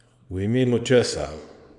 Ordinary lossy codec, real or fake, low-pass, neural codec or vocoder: none; fake; 10.8 kHz; codec, 24 kHz, 0.9 kbps, WavTokenizer, small release